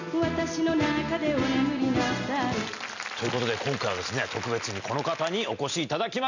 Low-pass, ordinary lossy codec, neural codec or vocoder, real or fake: 7.2 kHz; none; none; real